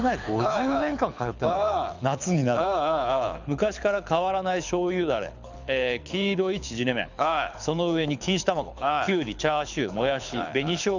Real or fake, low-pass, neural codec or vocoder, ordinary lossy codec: fake; 7.2 kHz; codec, 24 kHz, 6 kbps, HILCodec; none